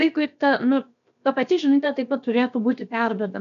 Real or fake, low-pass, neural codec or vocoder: fake; 7.2 kHz; codec, 16 kHz, 0.7 kbps, FocalCodec